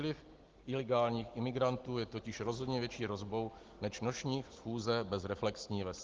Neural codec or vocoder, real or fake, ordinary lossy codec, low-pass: none; real; Opus, 16 kbps; 7.2 kHz